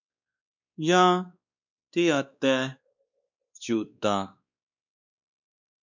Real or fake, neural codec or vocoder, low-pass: fake; codec, 16 kHz, 2 kbps, X-Codec, WavLM features, trained on Multilingual LibriSpeech; 7.2 kHz